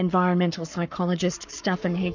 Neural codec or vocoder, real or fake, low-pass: codec, 44.1 kHz, 7.8 kbps, Pupu-Codec; fake; 7.2 kHz